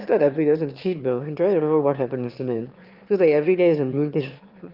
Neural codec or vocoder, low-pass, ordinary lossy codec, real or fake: autoencoder, 22.05 kHz, a latent of 192 numbers a frame, VITS, trained on one speaker; 5.4 kHz; Opus, 32 kbps; fake